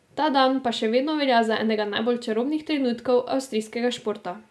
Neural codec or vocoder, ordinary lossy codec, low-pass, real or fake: none; none; none; real